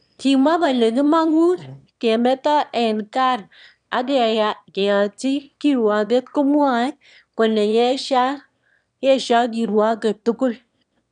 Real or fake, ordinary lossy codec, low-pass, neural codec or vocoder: fake; none; 9.9 kHz; autoencoder, 22.05 kHz, a latent of 192 numbers a frame, VITS, trained on one speaker